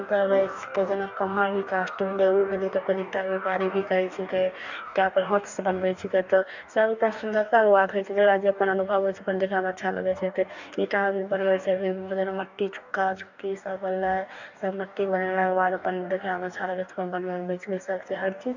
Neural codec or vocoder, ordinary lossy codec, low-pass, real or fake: codec, 44.1 kHz, 2.6 kbps, DAC; none; 7.2 kHz; fake